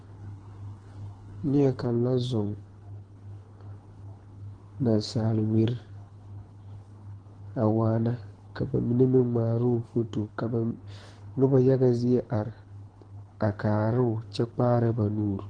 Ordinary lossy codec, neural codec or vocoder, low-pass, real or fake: Opus, 16 kbps; codec, 24 kHz, 6 kbps, HILCodec; 9.9 kHz; fake